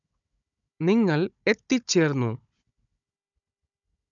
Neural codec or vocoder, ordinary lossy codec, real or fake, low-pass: codec, 16 kHz, 16 kbps, FunCodec, trained on Chinese and English, 50 frames a second; none; fake; 7.2 kHz